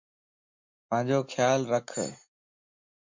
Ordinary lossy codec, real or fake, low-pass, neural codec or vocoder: MP3, 48 kbps; real; 7.2 kHz; none